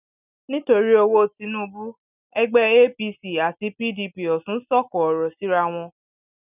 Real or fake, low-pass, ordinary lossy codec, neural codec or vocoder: real; 3.6 kHz; none; none